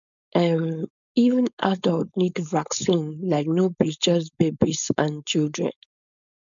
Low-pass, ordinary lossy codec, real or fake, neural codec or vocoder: 7.2 kHz; none; fake; codec, 16 kHz, 4.8 kbps, FACodec